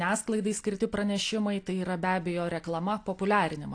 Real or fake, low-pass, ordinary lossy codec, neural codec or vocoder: real; 9.9 kHz; AAC, 48 kbps; none